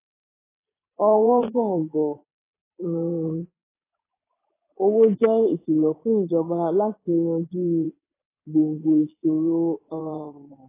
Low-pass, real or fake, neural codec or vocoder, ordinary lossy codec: 3.6 kHz; fake; vocoder, 22.05 kHz, 80 mel bands, Vocos; AAC, 16 kbps